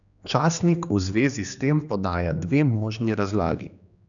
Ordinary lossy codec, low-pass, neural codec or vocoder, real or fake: none; 7.2 kHz; codec, 16 kHz, 2 kbps, X-Codec, HuBERT features, trained on general audio; fake